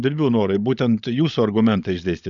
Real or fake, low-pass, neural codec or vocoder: fake; 7.2 kHz; codec, 16 kHz, 16 kbps, FunCodec, trained on LibriTTS, 50 frames a second